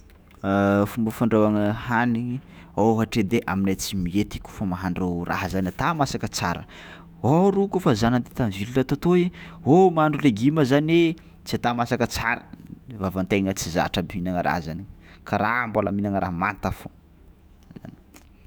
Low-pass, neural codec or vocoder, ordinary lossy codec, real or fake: none; autoencoder, 48 kHz, 128 numbers a frame, DAC-VAE, trained on Japanese speech; none; fake